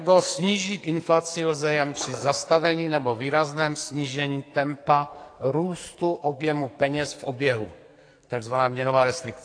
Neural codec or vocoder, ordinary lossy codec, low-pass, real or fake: codec, 44.1 kHz, 2.6 kbps, SNAC; AAC, 48 kbps; 9.9 kHz; fake